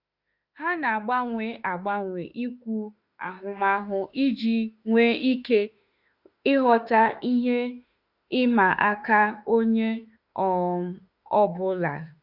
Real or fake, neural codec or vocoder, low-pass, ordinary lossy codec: fake; autoencoder, 48 kHz, 32 numbers a frame, DAC-VAE, trained on Japanese speech; 5.4 kHz; Opus, 64 kbps